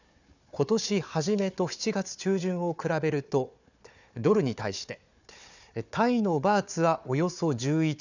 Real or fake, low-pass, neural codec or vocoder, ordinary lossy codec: fake; 7.2 kHz; codec, 16 kHz, 4 kbps, FunCodec, trained on Chinese and English, 50 frames a second; none